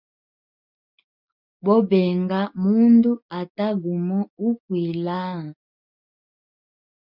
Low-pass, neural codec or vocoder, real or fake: 5.4 kHz; none; real